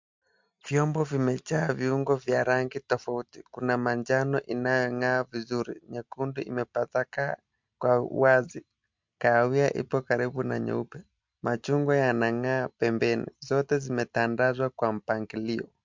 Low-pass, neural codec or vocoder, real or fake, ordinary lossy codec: 7.2 kHz; none; real; MP3, 64 kbps